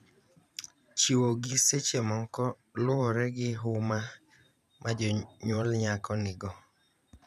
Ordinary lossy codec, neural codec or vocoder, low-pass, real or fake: none; vocoder, 44.1 kHz, 128 mel bands every 512 samples, BigVGAN v2; 14.4 kHz; fake